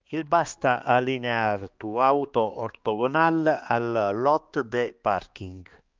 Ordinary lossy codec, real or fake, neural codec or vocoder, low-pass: Opus, 32 kbps; fake; codec, 16 kHz, 2 kbps, X-Codec, HuBERT features, trained on balanced general audio; 7.2 kHz